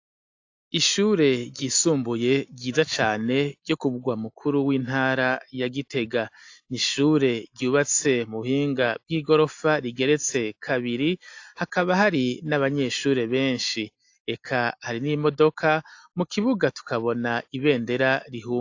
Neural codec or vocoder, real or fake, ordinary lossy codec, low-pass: none; real; AAC, 48 kbps; 7.2 kHz